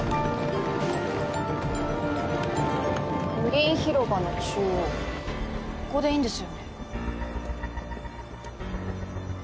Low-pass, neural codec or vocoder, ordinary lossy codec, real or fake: none; none; none; real